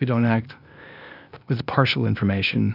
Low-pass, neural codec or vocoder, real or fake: 5.4 kHz; codec, 16 kHz, 0.8 kbps, ZipCodec; fake